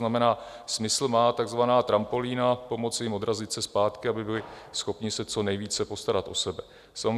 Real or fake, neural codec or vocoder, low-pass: real; none; 14.4 kHz